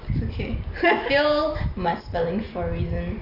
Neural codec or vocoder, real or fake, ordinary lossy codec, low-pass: none; real; none; 5.4 kHz